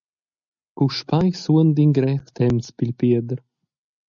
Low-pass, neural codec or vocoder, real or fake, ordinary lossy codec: 7.2 kHz; none; real; MP3, 96 kbps